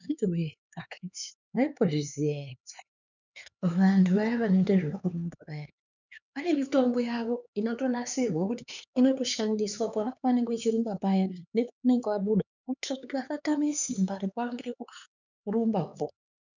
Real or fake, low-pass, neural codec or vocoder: fake; 7.2 kHz; codec, 16 kHz, 4 kbps, X-Codec, HuBERT features, trained on LibriSpeech